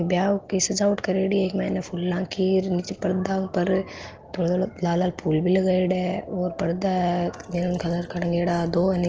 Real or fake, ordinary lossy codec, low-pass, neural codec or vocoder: real; Opus, 16 kbps; 7.2 kHz; none